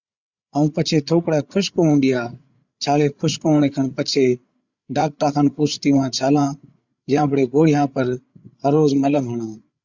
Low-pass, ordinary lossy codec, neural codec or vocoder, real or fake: 7.2 kHz; Opus, 64 kbps; codec, 16 kHz, 8 kbps, FreqCodec, larger model; fake